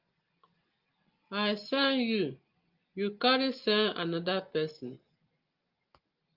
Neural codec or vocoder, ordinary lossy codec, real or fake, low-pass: none; Opus, 32 kbps; real; 5.4 kHz